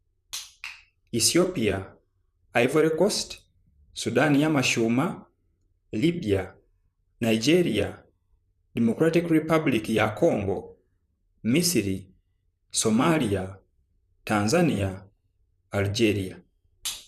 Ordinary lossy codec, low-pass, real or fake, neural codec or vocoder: none; 14.4 kHz; fake; vocoder, 44.1 kHz, 128 mel bands, Pupu-Vocoder